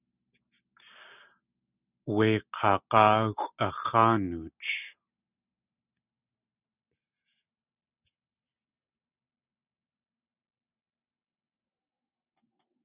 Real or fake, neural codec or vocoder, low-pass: real; none; 3.6 kHz